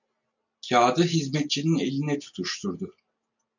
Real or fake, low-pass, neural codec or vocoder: real; 7.2 kHz; none